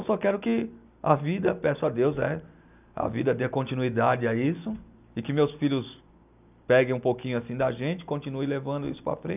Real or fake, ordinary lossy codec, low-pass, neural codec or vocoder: real; none; 3.6 kHz; none